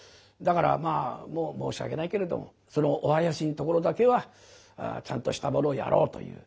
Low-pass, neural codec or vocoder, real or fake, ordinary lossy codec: none; none; real; none